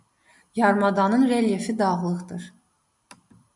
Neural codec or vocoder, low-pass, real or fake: none; 10.8 kHz; real